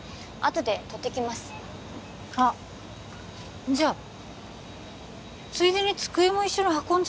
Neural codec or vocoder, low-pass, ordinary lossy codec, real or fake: none; none; none; real